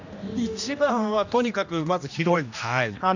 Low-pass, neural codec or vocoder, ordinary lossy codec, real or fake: 7.2 kHz; codec, 16 kHz, 1 kbps, X-Codec, HuBERT features, trained on general audio; none; fake